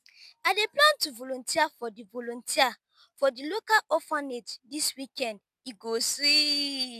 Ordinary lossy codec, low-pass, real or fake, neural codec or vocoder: none; 14.4 kHz; real; none